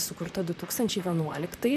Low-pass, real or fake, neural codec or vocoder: 14.4 kHz; fake; vocoder, 44.1 kHz, 128 mel bands, Pupu-Vocoder